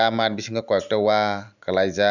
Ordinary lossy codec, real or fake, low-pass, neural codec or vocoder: none; real; 7.2 kHz; none